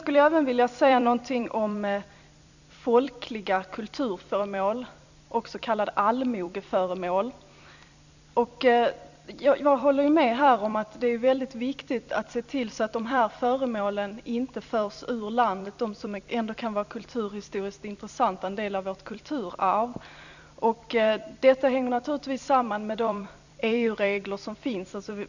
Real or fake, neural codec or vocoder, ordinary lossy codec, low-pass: fake; vocoder, 44.1 kHz, 128 mel bands every 256 samples, BigVGAN v2; none; 7.2 kHz